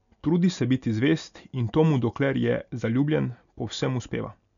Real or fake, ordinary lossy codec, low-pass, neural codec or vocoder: real; none; 7.2 kHz; none